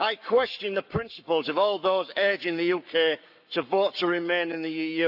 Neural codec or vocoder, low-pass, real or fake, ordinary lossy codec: codec, 44.1 kHz, 7.8 kbps, Pupu-Codec; 5.4 kHz; fake; none